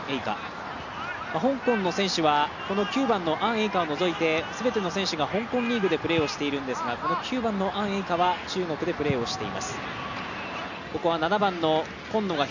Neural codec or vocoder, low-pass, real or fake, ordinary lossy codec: none; 7.2 kHz; real; none